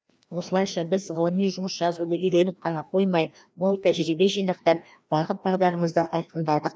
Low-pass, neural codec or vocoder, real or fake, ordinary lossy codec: none; codec, 16 kHz, 1 kbps, FreqCodec, larger model; fake; none